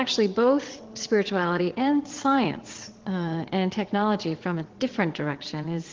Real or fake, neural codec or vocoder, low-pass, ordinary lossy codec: fake; vocoder, 22.05 kHz, 80 mel bands, WaveNeXt; 7.2 kHz; Opus, 16 kbps